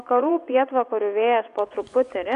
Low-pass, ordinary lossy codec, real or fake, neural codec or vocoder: 10.8 kHz; AAC, 96 kbps; fake; vocoder, 24 kHz, 100 mel bands, Vocos